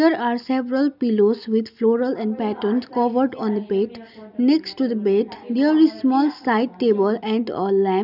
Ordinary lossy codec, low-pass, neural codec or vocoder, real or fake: none; 5.4 kHz; none; real